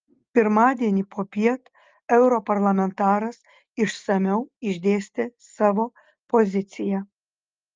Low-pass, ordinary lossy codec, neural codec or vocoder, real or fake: 7.2 kHz; Opus, 24 kbps; none; real